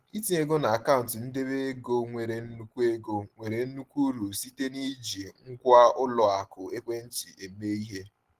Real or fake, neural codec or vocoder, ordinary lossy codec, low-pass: real; none; Opus, 16 kbps; 14.4 kHz